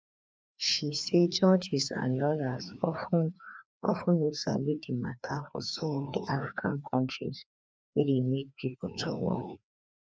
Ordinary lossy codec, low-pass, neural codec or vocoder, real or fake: none; none; codec, 16 kHz, 2 kbps, FreqCodec, larger model; fake